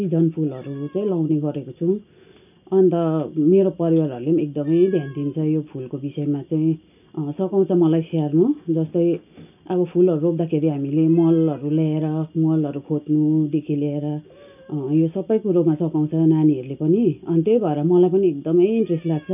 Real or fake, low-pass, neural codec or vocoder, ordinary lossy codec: real; 3.6 kHz; none; none